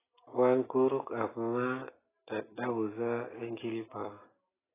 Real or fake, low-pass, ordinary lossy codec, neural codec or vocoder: real; 3.6 kHz; AAC, 16 kbps; none